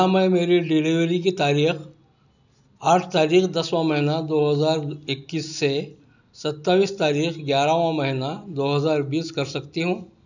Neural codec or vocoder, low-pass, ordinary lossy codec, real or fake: none; 7.2 kHz; none; real